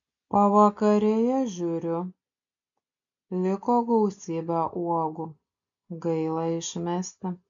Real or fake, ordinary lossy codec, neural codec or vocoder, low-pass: real; MP3, 96 kbps; none; 7.2 kHz